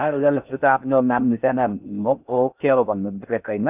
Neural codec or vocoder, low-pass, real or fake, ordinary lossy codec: codec, 16 kHz in and 24 kHz out, 0.6 kbps, FocalCodec, streaming, 4096 codes; 3.6 kHz; fake; none